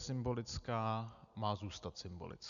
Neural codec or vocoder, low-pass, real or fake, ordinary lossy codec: none; 7.2 kHz; real; MP3, 96 kbps